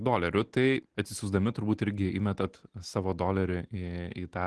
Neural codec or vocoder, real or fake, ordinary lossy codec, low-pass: none; real; Opus, 16 kbps; 10.8 kHz